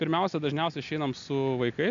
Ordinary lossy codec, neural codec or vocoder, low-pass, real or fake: AAC, 64 kbps; none; 7.2 kHz; real